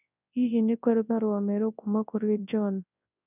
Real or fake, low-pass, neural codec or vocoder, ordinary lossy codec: fake; 3.6 kHz; codec, 24 kHz, 0.9 kbps, WavTokenizer, large speech release; none